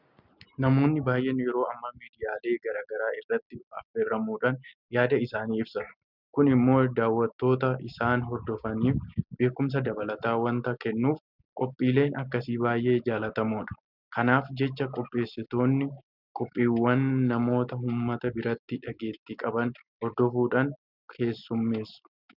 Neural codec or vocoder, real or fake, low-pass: none; real; 5.4 kHz